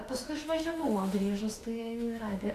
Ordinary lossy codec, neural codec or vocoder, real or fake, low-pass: AAC, 64 kbps; autoencoder, 48 kHz, 32 numbers a frame, DAC-VAE, trained on Japanese speech; fake; 14.4 kHz